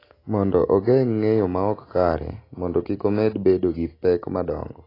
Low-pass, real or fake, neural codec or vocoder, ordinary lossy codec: 5.4 kHz; real; none; AAC, 24 kbps